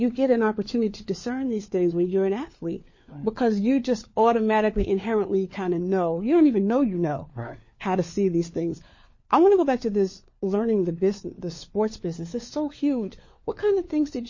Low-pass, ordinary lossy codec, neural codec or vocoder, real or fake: 7.2 kHz; MP3, 32 kbps; codec, 16 kHz, 4 kbps, FunCodec, trained on LibriTTS, 50 frames a second; fake